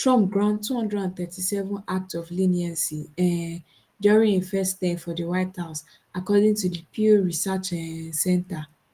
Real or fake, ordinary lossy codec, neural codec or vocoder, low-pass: real; Opus, 24 kbps; none; 14.4 kHz